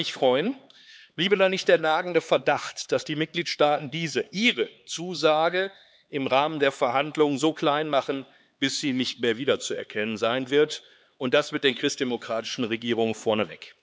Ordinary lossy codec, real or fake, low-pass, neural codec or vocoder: none; fake; none; codec, 16 kHz, 4 kbps, X-Codec, HuBERT features, trained on LibriSpeech